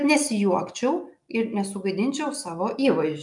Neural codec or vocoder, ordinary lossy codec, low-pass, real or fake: none; MP3, 96 kbps; 10.8 kHz; real